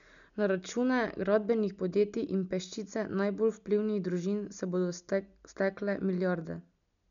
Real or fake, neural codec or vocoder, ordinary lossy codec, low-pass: real; none; none; 7.2 kHz